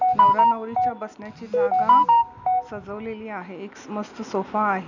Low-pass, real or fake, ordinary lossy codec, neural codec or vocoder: 7.2 kHz; real; none; none